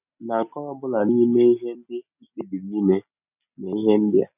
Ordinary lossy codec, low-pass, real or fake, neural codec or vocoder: none; 3.6 kHz; real; none